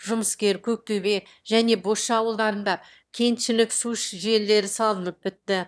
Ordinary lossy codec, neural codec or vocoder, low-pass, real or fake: none; autoencoder, 22.05 kHz, a latent of 192 numbers a frame, VITS, trained on one speaker; none; fake